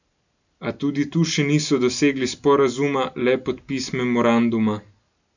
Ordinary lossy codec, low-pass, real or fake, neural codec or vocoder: none; 7.2 kHz; real; none